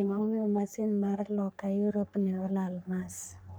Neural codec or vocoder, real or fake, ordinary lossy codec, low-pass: codec, 44.1 kHz, 3.4 kbps, Pupu-Codec; fake; none; none